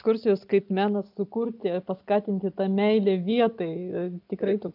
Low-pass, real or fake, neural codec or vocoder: 5.4 kHz; real; none